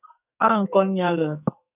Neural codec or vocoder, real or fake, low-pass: codec, 44.1 kHz, 2.6 kbps, SNAC; fake; 3.6 kHz